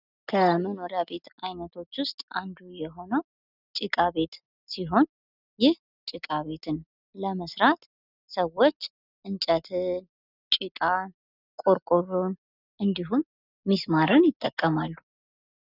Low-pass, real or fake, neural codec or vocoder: 5.4 kHz; real; none